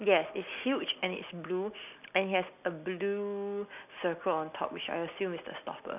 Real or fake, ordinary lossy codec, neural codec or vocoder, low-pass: real; none; none; 3.6 kHz